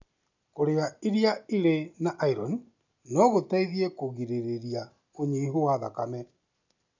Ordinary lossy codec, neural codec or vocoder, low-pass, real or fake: none; none; 7.2 kHz; real